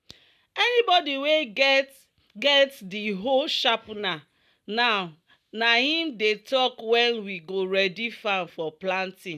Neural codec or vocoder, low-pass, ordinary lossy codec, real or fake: none; 14.4 kHz; none; real